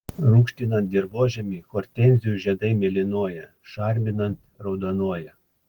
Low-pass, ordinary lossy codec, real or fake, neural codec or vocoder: 19.8 kHz; Opus, 16 kbps; real; none